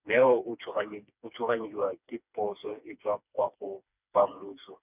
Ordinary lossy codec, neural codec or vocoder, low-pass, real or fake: none; codec, 16 kHz, 2 kbps, FreqCodec, smaller model; 3.6 kHz; fake